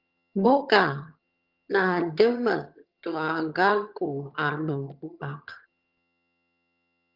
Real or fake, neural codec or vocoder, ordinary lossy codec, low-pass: fake; vocoder, 22.05 kHz, 80 mel bands, HiFi-GAN; Opus, 32 kbps; 5.4 kHz